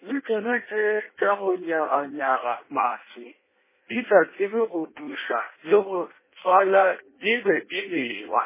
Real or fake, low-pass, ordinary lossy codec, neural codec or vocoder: fake; 3.6 kHz; MP3, 16 kbps; codec, 16 kHz in and 24 kHz out, 0.6 kbps, FireRedTTS-2 codec